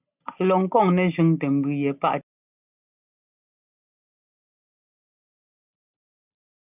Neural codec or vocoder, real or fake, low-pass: none; real; 3.6 kHz